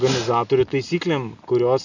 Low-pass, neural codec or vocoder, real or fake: 7.2 kHz; none; real